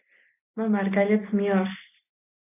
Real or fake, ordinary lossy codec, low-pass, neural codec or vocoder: real; AAC, 32 kbps; 3.6 kHz; none